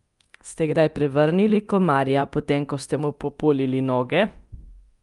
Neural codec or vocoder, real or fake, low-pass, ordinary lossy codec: codec, 24 kHz, 0.9 kbps, DualCodec; fake; 10.8 kHz; Opus, 32 kbps